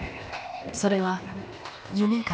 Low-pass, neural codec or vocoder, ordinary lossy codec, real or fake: none; codec, 16 kHz, 0.8 kbps, ZipCodec; none; fake